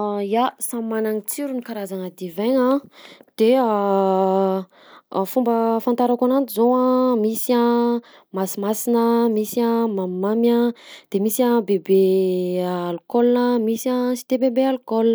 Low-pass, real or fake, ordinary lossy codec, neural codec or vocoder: none; real; none; none